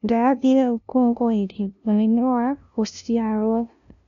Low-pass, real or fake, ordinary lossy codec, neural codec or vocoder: 7.2 kHz; fake; none; codec, 16 kHz, 0.5 kbps, FunCodec, trained on LibriTTS, 25 frames a second